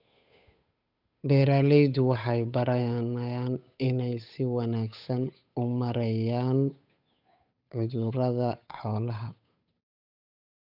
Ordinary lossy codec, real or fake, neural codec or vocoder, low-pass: none; fake; codec, 16 kHz, 8 kbps, FunCodec, trained on Chinese and English, 25 frames a second; 5.4 kHz